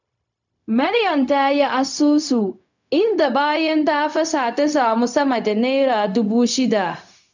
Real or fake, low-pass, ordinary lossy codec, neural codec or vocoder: fake; 7.2 kHz; none; codec, 16 kHz, 0.4 kbps, LongCat-Audio-Codec